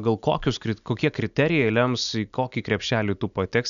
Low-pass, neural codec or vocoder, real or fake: 7.2 kHz; none; real